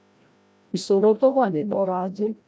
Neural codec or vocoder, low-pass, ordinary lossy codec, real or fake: codec, 16 kHz, 0.5 kbps, FreqCodec, larger model; none; none; fake